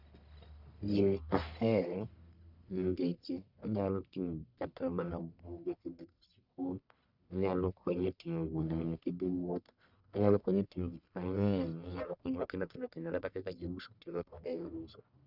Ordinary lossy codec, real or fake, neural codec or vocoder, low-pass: none; fake; codec, 44.1 kHz, 1.7 kbps, Pupu-Codec; 5.4 kHz